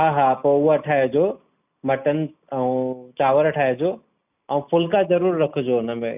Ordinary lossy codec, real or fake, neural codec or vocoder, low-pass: none; real; none; 3.6 kHz